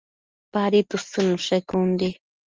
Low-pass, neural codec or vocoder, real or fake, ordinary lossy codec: 7.2 kHz; none; real; Opus, 24 kbps